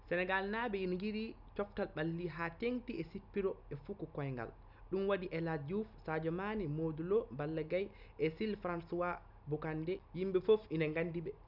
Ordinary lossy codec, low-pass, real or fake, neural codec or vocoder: none; 5.4 kHz; real; none